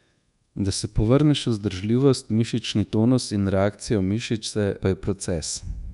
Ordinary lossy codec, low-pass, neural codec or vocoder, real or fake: none; 10.8 kHz; codec, 24 kHz, 1.2 kbps, DualCodec; fake